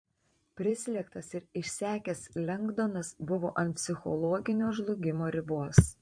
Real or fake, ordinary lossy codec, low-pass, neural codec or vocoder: fake; MP3, 48 kbps; 9.9 kHz; vocoder, 44.1 kHz, 128 mel bands every 512 samples, BigVGAN v2